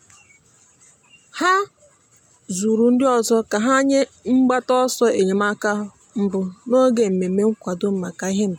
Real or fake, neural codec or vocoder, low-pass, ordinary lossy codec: real; none; 19.8 kHz; MP3, 96 kbps